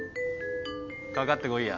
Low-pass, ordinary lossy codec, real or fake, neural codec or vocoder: 7.2 kHz; none; real; none